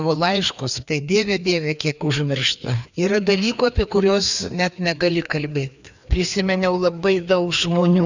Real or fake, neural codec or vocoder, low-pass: fake; codec, 16 kHz, 2 kbps, FreqCodec, larger model; 7.2 kHz